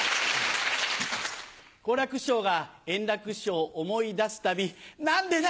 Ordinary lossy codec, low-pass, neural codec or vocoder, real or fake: none; none; none; real